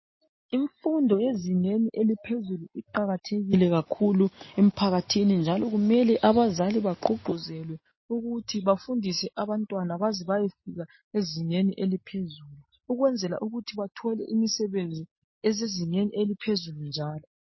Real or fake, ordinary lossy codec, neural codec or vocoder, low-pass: real; MP3, 24 kbps; none; 7.2 kHz